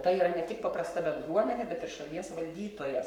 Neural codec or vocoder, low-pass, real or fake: codec, 44.1 kHz, 7.8 kbps, Pupu-Codec; 19.8 kHz; fake